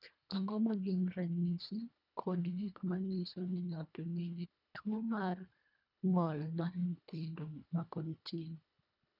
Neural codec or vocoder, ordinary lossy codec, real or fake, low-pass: codec, 24 kHz, 1.5 kbps, HILCodec; none; fake; 5.4 kHz